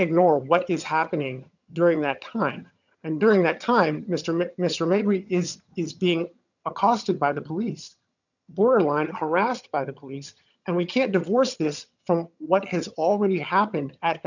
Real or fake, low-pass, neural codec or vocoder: fake; 7.2 kHz; vocoder, 22.05 kHz, 80 mel bands, HiFi-GAN